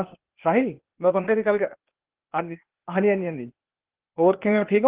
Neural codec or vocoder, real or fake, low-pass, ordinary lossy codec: codec, 16 kHz, 0.8 kbps, ZipCodec; fake; 3.6 kHz; Opus, 16 kbps